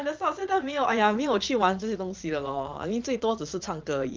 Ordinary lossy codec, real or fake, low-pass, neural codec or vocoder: Opus, 24 kbps; fake; 7.2 kHz; vocoder, 22.05 kHz, 80 mel bands, Vocos